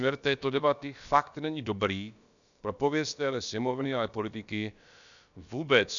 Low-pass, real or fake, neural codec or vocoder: 7.2 kHz; fake; codec, 16 kHz, about 1 kbps, DyCAST, with the encoder's durations